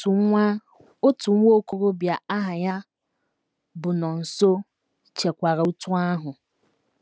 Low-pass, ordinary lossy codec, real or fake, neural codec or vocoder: none; none; real; none